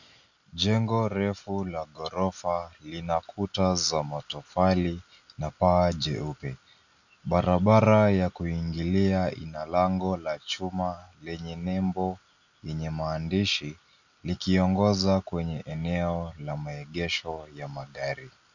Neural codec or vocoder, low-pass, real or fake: none; 7.2 kHz; real